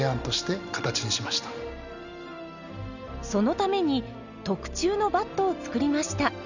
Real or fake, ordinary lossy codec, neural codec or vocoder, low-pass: real; none; none; 7.2 kHz